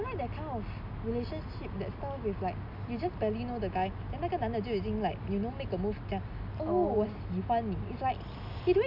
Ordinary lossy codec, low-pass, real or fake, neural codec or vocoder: none; 5.4 kHz; real; none